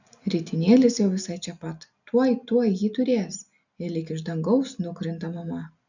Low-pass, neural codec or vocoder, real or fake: 7.2 kHz; none; real